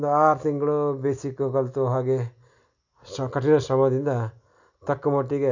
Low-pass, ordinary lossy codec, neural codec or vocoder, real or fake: 7.2 kHz; none; none; real